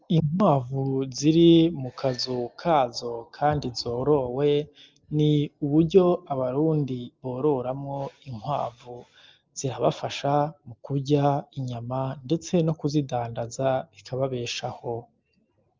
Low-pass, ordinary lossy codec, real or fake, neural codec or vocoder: 7.2 kHz; Opus, 24 kbps; real; none